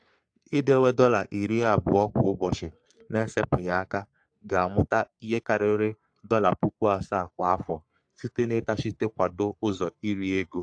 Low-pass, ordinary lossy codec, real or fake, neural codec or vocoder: 9.9 kHz; AAC, 64 kbps; fake; codec, 44.1 kHz, 3.4 kbps, Pupu-Codec